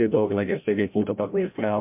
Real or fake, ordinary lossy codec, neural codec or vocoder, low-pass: fake; MP3, 32 kbps; codec, 16 kHz, 0.5 kbps, FreqCodec, larger model; 3.6 kHz